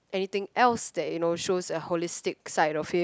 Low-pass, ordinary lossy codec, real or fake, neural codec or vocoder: none; none; real; none